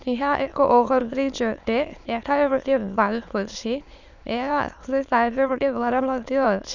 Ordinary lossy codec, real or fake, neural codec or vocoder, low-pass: none; fake; autoencoder, 22.05 kHz, a latent of 192 numbers a frame, VITS, trained on many speakers; 7.2 kHz